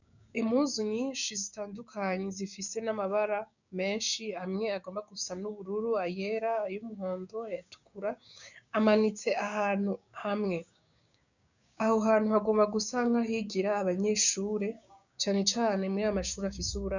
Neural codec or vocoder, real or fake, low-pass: codec, 16 kHz, 6 kbps, DAC; fake; 7.2 kHz